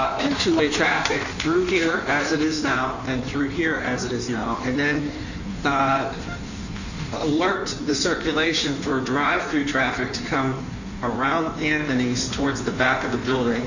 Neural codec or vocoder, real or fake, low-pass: codec, 16 kHz in and 24 kHz out, 1.1 kbps, FireRedTTS-2 codec; fake; 7.2 kHz